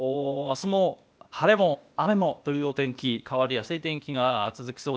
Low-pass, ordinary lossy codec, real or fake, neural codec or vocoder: none; none; fake; codec, 16 kHz, 0.8 kbps, ZipCodec